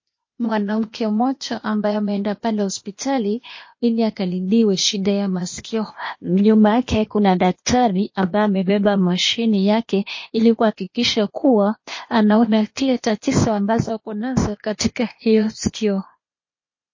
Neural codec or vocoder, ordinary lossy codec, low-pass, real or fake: codec, 16 kHz, 0.8 kbps, ZipCodec; MP3, 32 kbps; 7.2 kHz; fake